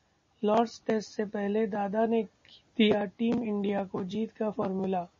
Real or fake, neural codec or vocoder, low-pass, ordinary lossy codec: real; none; 7.2 kHz; MP3, 32 kbps